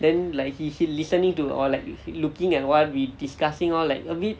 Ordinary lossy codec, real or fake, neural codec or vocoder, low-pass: none; real; none; none